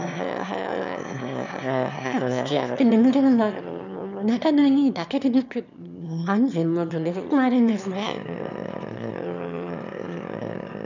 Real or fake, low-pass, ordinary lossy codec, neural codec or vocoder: fake; 7.2 kHz; none; autoencoder, 22.05 kHz, a latent of 192 numbers a frame, VITS, trained on one speaker